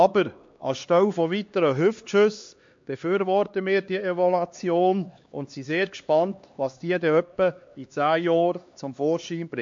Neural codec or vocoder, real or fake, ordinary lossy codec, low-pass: codec, 16 kHz, 2 kbps, X-Codec, HuBERT features, trained on LibriSpeech; fake; MP3, 48 kbps; 7.2 kHz